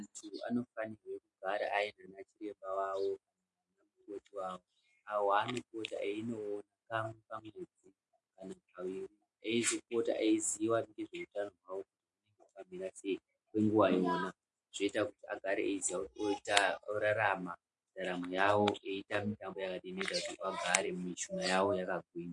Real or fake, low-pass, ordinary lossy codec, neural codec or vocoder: real; 10.8 kHz; MP3, 48 kbps; none